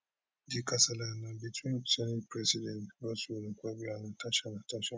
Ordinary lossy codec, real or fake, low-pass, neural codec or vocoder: none; real; none; none